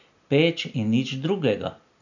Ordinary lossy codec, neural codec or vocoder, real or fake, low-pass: none; none; real; 7.2 kHz